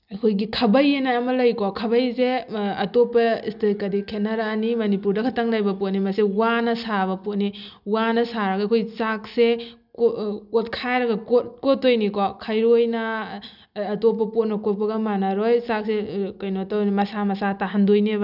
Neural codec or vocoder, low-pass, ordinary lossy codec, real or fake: none; 5.4 kHz; none; real